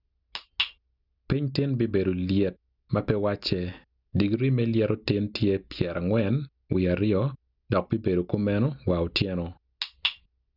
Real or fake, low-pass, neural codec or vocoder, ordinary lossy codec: real; 5.4 kHz; none; none